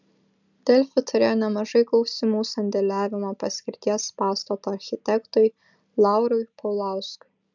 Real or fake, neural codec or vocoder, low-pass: real; none; 7.2 kHz